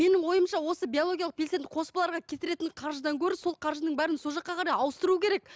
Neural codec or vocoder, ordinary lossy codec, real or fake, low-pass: none; none; real; none